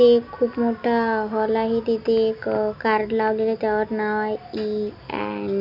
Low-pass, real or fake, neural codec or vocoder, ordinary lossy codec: 5.4 kHz; real; none; none